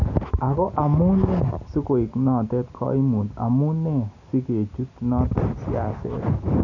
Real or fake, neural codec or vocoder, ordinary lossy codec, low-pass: real; none; none; 7.2 kHz